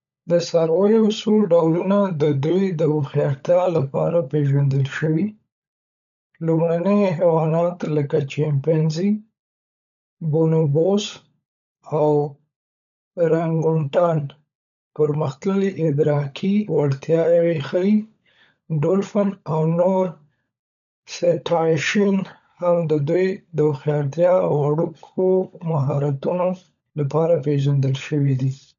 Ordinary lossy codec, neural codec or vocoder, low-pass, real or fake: none; codec, 16 kHz, 16 kbps, FunCodec, trained on LibriTTS, 50 frames a second; 7.2 kHz; fake